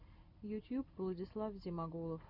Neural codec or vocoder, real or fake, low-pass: none; real; 5.4 kHz